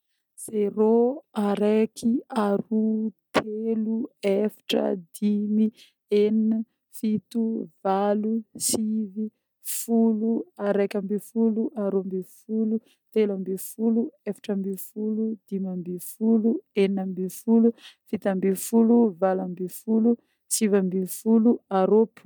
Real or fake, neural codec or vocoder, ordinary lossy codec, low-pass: real; none; none; 19.8 kHz